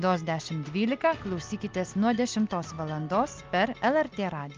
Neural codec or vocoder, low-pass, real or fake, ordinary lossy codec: none; 7.2 kHz; real; Opus, 24 kbps